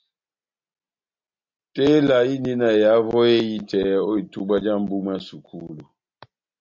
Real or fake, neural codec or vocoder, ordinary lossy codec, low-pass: real; none; MP3, 48 kbps; 7.2 kHz